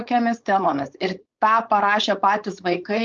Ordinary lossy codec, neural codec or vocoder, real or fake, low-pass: Opus, 24 kbps; codec, 16 kHz, 4.8 kbps, FACodec; fake; 7.2 kHz